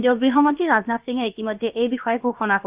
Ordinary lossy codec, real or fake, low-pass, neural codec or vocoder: Opus, 24 kbps; fake; 3.6 kHz; codec, 16 kHz, about 1 kbps, DyCAST, with the encoder's durations